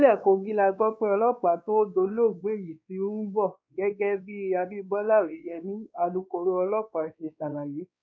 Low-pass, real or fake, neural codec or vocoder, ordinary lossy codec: none; fake; codec, 16 kHz, 2 kbps, X-Codec, WavLM features, trained on Multilingual LibriSpeech; none